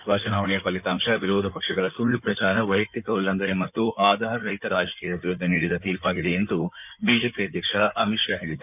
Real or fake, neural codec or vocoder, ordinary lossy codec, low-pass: fake; codec, 16 kHz in and 24 kHz out, 2.2 kbps, FireRedTTS-2 codec; none; 3.6 kHz